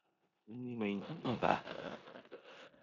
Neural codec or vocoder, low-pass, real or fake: codec, 16 kHz in and 24 kHz out, 0.9 kbps, LongCat-Audio-Codec, four codebook decoder; 7.2 kHz; fake